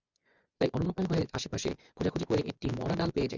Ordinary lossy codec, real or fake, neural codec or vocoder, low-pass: Opus, 64 kbps; fake; vocoder, 24 kHz, 100 mel bands, Vocos; 7.2 kHz